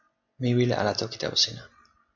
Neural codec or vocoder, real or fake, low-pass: none; real; 7.2 kHz